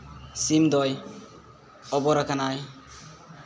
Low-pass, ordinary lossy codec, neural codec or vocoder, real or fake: none; none; none; real